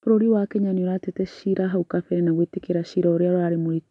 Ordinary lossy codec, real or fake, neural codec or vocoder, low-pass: none; real; none; 10.8 kHz